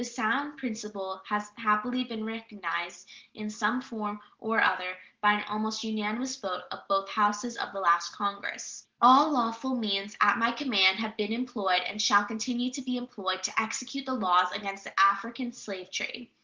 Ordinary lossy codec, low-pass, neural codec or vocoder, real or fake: Opus, 16 kbps; 7.2 kHz; none; real